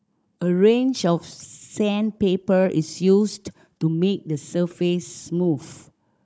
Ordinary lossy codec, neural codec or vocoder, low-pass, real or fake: none; codec, 16 kHz, 16 kbps, FunCodec, trained on Chinese and English, 50 frames a second; none; fake